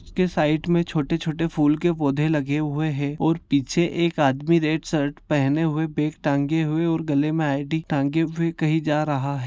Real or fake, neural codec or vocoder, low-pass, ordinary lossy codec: real; none; none; none